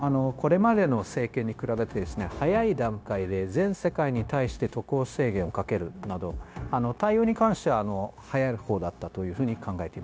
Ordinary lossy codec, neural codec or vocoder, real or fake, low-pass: none; codec, 16 kHz, 0.9 kbps, LongCat-Audio-Codec; fake; none